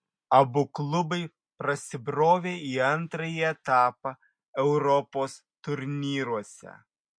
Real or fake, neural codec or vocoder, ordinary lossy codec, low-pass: real; none; MP3, 48 kbps; 9.9 kHz